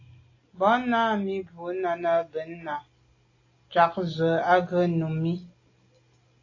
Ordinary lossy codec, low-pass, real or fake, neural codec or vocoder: AAC, 32 kbps; 7.2 kHz; real; none